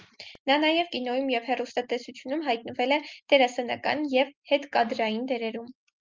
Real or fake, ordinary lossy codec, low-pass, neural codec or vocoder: real; Opus, 24 kbps; 7.2 kHz; none